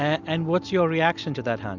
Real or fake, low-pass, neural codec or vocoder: real; 7.2 kHz; none